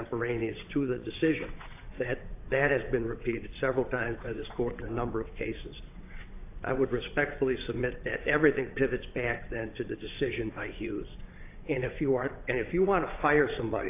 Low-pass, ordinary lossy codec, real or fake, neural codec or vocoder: 3.6 kHz; AAC, 24 kbps; fake; vocoder, 22.05 kHz, 80 mel bands, WaveNeXt